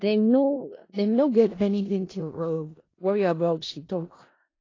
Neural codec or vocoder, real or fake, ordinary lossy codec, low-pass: codec, 16 kHz in and 24 kHz out, 0.4 kbps, LongCat-Audio-Codec, four codebook decoder; fake; AAC, 32 kbps; 7.2 kHz